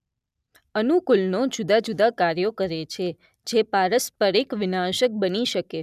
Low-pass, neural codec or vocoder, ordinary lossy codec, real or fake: 14.4 kHz; none; none; real